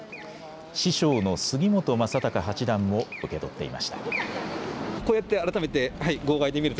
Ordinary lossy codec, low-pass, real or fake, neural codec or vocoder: none; none; real; none